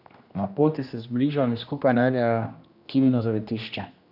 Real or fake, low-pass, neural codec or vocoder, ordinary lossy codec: fake; 5.4 kHz; codec, 16 kHz, 1 kbps, X-Codec, HuBERT features, trained on general audio; none